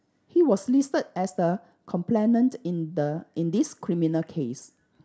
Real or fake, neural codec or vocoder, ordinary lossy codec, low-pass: real; none; none; none